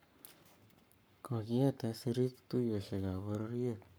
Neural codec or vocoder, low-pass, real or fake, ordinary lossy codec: codec, 44.1 kHz, 7.8 kbps, Pupu-Codec; none; fake; none